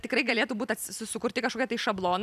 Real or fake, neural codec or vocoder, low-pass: fake; vocoder, 44.1 kHz, 128 mel bands every 256 samples, BigVGAN v2; 14.4 kHz